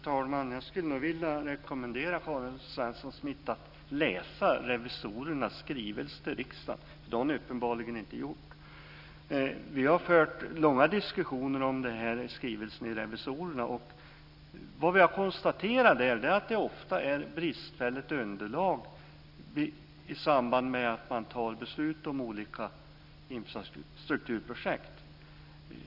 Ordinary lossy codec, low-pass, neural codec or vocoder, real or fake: none; 5.4 kHz; none; real